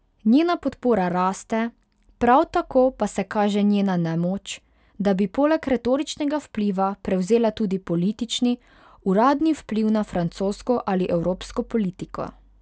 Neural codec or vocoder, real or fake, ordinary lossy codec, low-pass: none; real; none; none